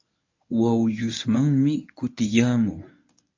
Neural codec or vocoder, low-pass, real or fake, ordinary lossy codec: codec, 24 kHz, 0.9 kbps, WavTokenizer, medium speech release version 1; 7.2 kHz; fake; MP3, 48 kbps